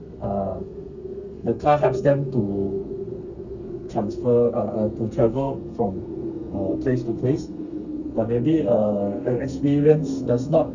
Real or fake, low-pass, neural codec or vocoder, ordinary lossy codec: fake; 7.2 kHz; codec, 32 kHz, 1.9 kbps, SNAC; none